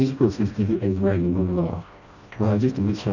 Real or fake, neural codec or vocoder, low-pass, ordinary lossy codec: fake; codec, 16 kHz, 1 kbps, FreqCodec, smaller model; 7.2 kHz; none